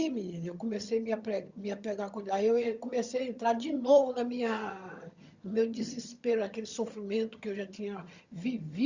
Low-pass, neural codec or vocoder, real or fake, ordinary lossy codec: 7.2 kHz; vocoder, 22.05 kHz, 80 mel bands, HiFi-GAN; fake; Opus, 64 kbps